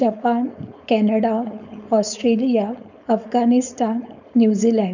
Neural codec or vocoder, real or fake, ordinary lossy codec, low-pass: codec, 16 kHz, 4.8 kbps, FACodec; fake; none; 7.2 kHz